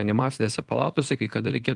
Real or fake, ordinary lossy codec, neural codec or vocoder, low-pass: fake; Opus, 24 kbps; codec, 24 kHz, 0.9 kbps, WavTokenizer, small release; 10.8 kHz